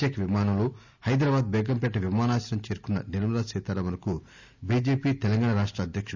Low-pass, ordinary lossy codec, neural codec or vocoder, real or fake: 7.2 kHz; none; none; real